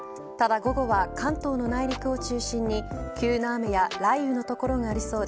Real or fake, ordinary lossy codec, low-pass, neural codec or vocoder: real; none; none; none